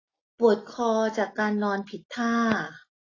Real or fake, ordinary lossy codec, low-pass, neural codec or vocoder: real; AAC, 32 kbps; 7.2 kHz; none